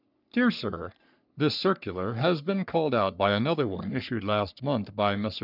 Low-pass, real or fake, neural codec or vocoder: 5.4 kHz; fake; codec, 44.1 kHz, 3.4 kbps, Pupu-Codec